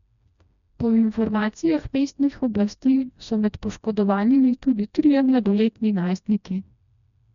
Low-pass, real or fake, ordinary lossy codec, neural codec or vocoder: 7.2 kHz; fake; none; codec, 16 kHz, 1 kbps, FreqCodec, smaller model